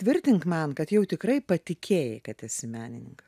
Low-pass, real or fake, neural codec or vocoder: 14.4 kHz; real; none